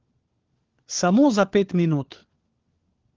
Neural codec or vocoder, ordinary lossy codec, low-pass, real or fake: codec, 16 kHz, 4 kbps, FunCodec, trained on LibriTTS, 50 frames a second; Opus, 16 kbps; 7.2 kHz; fake